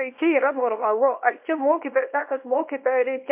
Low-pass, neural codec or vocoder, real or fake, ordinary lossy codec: 3.6 kHz; codec, 24 kHz, 0.9 kbps, WavTokenizer, small release; fake; MP3, 32 kbps